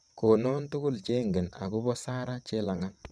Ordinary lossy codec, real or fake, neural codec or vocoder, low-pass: none; fake; vocoder, 22.05 kHz, 80 mel bands, WaveNeXt; none